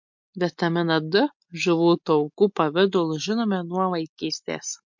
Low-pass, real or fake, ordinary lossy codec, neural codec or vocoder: 7.2 kHz; real; MP3, 48 kbps; none